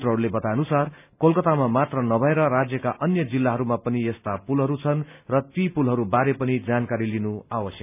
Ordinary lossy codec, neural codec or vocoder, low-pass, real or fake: none; none; 3.6 kHz; real